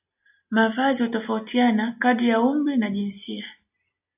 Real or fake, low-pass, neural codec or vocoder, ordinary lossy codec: real; 3.6 kHz; none; AAC, 32 kbps